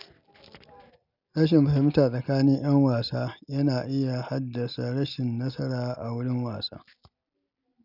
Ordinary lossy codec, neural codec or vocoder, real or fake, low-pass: none; none; real; 5.4 kHz